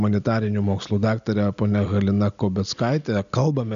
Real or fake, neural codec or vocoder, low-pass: real; none; 7.2 kHz